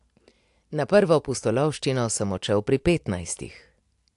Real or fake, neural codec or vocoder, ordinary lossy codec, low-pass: real; none; AAC, 64 kbps; 10.8 kHz